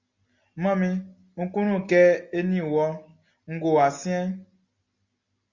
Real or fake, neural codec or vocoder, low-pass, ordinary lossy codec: real; none; 7.2 kHz; Opus, 64 kbps